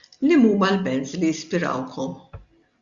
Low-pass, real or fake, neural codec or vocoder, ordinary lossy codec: 7.2 kHz; real; none; Opus, 64 kbps